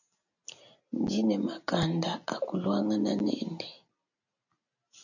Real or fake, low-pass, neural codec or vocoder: real; 7.2 kHz; none